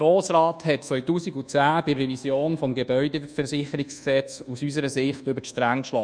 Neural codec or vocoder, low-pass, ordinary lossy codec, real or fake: codec, 24 kHz, 1.2 kbps, DualCodec; 9.9 kHz; none; fake